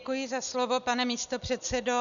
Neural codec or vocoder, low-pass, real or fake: none; 7.2 kHz; real